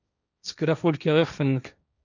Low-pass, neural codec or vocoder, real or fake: 7.2 kHz; codec, 16 kHz, 1.1 kbps, Voila-Tokenizer; fake